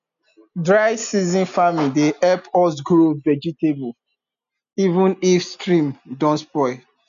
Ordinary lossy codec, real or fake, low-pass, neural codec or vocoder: none; real; 7.2 kHz; none